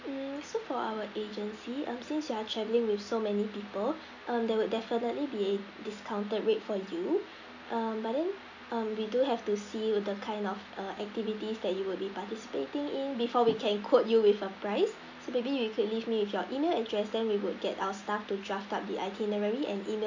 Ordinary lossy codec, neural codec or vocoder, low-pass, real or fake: AAC, 48 kbps; none; 7.2 kHz; real